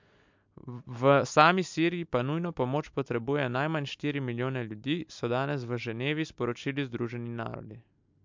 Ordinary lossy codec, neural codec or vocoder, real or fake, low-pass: MP3, 64 kbps; none; real; 7.2 kHz